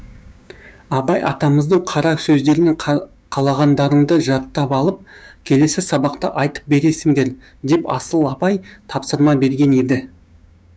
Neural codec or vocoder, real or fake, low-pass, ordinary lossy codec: codec, 16 kHz, 6 kbps, DAC; fake; none; none